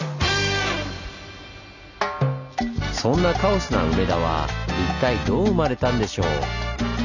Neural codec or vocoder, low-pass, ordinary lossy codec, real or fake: none; 7.2 kHz; none; real